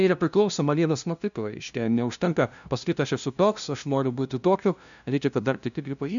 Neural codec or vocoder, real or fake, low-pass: codec, 16 kHz, 0.5 kbps, FunCodec, trained on LibriTTS, 25 frames a second; fake; 7.2 kHz